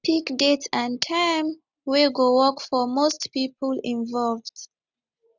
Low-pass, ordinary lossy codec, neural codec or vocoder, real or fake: 7.2 kHz; none; none; real